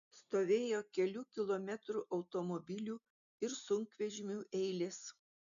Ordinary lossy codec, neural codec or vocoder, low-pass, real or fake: MP3, 64 kbps; none; 7.2 kHz; real